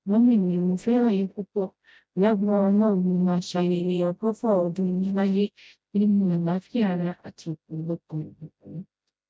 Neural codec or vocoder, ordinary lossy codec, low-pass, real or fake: codec, 16 kHz, 0.5 kbps, FreqCodec, smaller model; none; none; fake